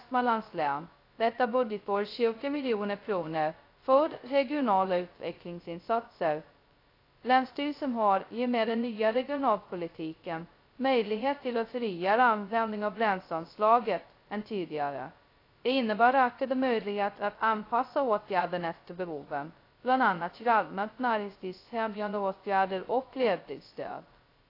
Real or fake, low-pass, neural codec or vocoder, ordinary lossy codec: fake; 5.4 kHz; codec, 16 kHz, 0.2 kbps, FocalCodec; AAC, 32 kbps